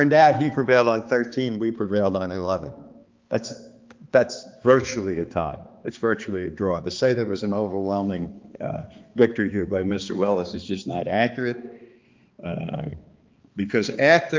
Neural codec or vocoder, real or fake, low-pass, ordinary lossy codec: codec, 16 kHz, 2 kbps, X-Codec, HuBERT features, trained on balanced general audio; fake; 7.2 kHz; Opus, 24 kbps